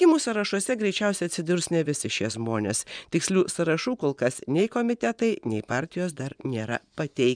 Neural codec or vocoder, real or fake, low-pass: vocoder, 24 kHz, 100 mel bands, Vocos; fake; 9.9 kHz